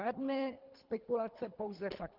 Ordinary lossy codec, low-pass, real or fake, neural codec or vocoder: Opus, 32 kbps; 5.4 kHz; fake; codec, 24 kHz, 3 kbps, HILCodec